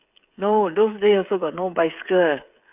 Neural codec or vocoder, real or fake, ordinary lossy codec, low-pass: codec, 16 kHz, 8 kbps, FreqCodec, smaller model; fake; none; 3.6 kHz